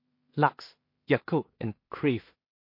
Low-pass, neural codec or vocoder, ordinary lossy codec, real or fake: 5.4 kHz; codec, 16 kHz in and 24 kHz out, 0.4 kbps, LongCat-Audio-Codec, two codebook decoder; MP3, 32 kbps; fake